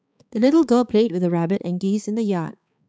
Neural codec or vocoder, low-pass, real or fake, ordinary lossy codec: codec, 16 kHz, 4 kbps, X-Codec, HuBERT features, trained on balanced general audio; none; fake; none